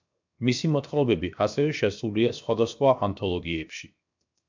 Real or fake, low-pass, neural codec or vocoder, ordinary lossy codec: fake; 7.2 kHz; codec, 16 kHz, 0.7 kbps, FocalCodec; MP3, 64 kbps